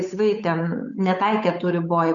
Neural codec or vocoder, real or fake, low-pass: codec, 16 kHz, 8 kbps, FunCodec, trained on Chinese and English, 25 frames a second; fake; 7.2 kHz